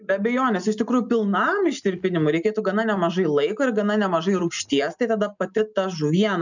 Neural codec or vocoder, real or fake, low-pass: none; real; 7.2 kHz